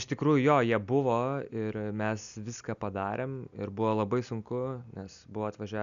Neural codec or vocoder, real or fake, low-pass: none; real; 7.2 kHz